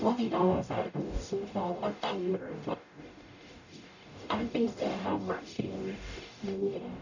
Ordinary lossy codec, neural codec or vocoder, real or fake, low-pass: none; codec, 44.1 kHz, 0.9 kbps, DAC; fake; 7.2 kHz